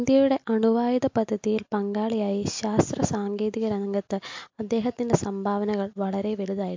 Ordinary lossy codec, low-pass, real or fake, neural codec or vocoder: MP3, 48 kbps; 7.2 kHz; real; none